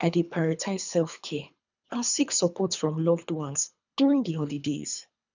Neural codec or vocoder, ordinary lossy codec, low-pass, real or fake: codec, 24 kHz, 3 kbps, HILCodec; none; 7.2 kHz; fake